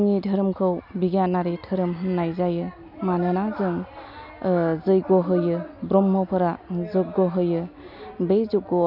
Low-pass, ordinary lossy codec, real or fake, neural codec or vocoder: 5.4 kHz; none; real; none